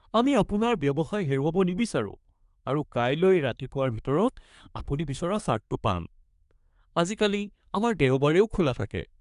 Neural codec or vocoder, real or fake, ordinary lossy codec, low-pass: codec, 24 kHz, 1 kbps, SNAC; fake; none; 10.8 kHz